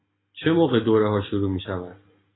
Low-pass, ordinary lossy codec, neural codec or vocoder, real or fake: 7.2 kHz; AAC, 16 kbps; none; real